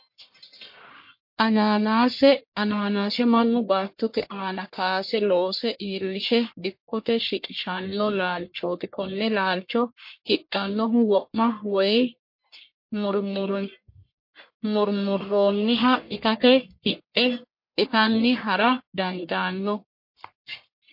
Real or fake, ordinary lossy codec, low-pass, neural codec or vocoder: fake; MP3, 32 kbps; 5.4 kHz; codec, 44.1 kHz, 1.7 kbps, Pupu-Codec